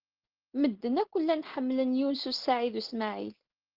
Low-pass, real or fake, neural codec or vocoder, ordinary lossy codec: 5.4 kHz; real; none; Opus, 16 kbps